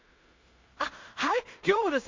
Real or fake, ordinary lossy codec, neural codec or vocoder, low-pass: fake; MP3, 48 kbps; codec, 16 kHz in and 24 kHz out, 0.4 kbps, LongCat-Audio-Codec, two codebook decoder; 7.2 kHz